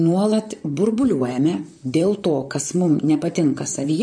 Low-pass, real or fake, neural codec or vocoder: 9.9 kHz; fake; vocoder, 44.1 kHz, 128 mel bands, Pupu-Vocoder